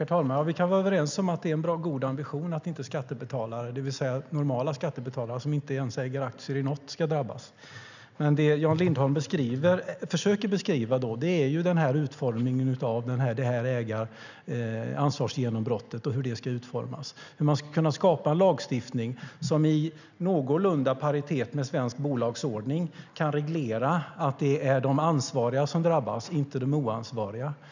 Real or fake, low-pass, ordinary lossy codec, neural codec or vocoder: real; 7.2 kHz; none; none